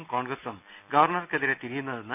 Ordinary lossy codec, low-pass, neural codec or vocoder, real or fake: none; 3.6 kHz; none; real